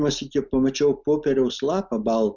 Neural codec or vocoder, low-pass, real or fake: none; 7.2 kHz; real